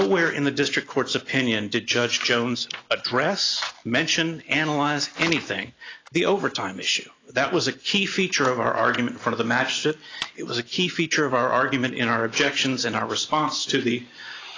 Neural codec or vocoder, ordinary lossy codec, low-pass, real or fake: none; AAC, 32 kbps; 7.2 kHz; real